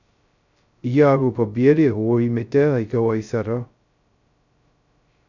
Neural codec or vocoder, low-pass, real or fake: codec, 16 kHz, 0.2 kbps, FocalCodec; 7.2 kHz; fake